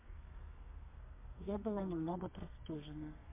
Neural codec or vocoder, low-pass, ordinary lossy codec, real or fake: codec, 32 kHz, 1.9 kbps, SNAC; 3.6 kHz; MP3, 32 kbps; fake